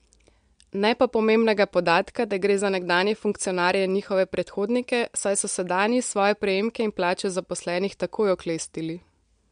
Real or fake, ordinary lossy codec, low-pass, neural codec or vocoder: real; MP3, 64 kbps; 9.9 kHz; none